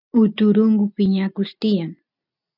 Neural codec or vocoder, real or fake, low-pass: none; real; 5.4 kHz